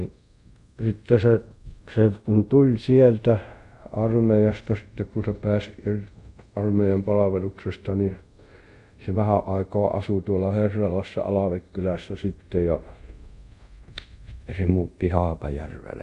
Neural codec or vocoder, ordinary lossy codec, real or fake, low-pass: codec, 24 kHz, 0.5 kbps, DualCodec; none; fake; 10.8 kHz